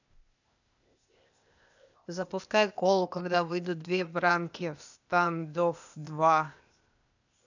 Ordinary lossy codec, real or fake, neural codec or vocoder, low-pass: none; fake; codec, 16 kHz, 0.8 kbps, ZipCodec; 7.2 kHz